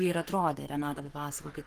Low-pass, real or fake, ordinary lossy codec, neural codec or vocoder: 14.4 kHz; fake; Opus, 24 kbps; codec, 44.1 kHz, 3.4 kbps, Pupu-Codec